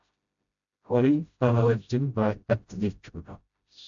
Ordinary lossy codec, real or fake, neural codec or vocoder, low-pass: MP3, 64 kbps; fake; codec, 16 kHz, 0.5 kbps, FreqCodec, smaller model; 7.2 kHz